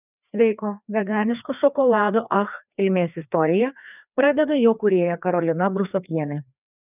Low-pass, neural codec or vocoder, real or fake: 3.6 kHz; codec, 16 kHz, 2 kbps, FreqCodec, larger model; fake